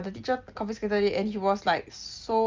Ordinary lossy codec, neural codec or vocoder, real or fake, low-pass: Opus, 32 kbps; none; real; 7.2 kHz